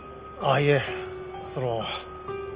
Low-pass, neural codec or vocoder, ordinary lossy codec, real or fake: 3.6 kHz; none; Opus, 24 kbps; real